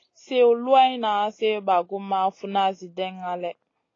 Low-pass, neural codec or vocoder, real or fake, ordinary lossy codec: 7.2 kHz; none; real; AAC, 32 kbps